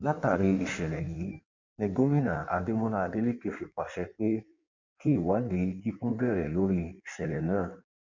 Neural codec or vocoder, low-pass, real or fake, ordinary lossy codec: codec, 16 kHz in and 24 kHz out, 1.1 kbps, FireRedTTS-2 codec; 7.2 kHz; fake; MP3, 64 kbps